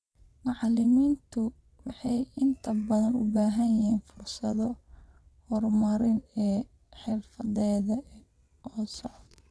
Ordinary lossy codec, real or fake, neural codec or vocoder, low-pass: none; fake; vocoder, 22.05 kHz, 80 mel bands, WaveNeXt; none